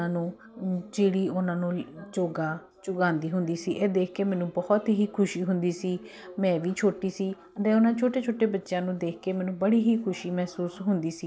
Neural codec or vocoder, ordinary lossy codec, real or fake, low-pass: none; none; real; none